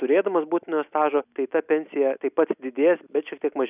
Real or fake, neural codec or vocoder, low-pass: real; none; 3.6 kHz